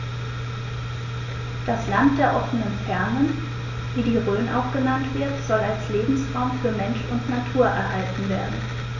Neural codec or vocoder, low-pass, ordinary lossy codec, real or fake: none; 7.2 kHz; none; real